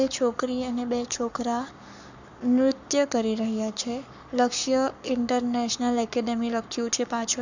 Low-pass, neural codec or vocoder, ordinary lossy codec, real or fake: 7.2 kHz; codec, 44.1 kHz, 7.8 kbps, Pupu-Codec; none; fake